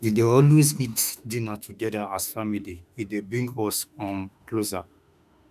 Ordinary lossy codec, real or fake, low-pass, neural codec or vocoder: none; fake; 14.4 kHz; autoencoder, 48 kHz, 32 numbers a frame, DAC-VAE, trained on Japanese speech